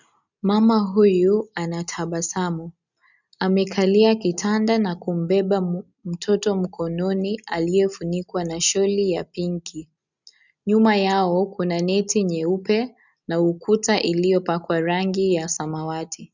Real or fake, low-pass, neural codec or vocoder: real; 7.2 kHz; none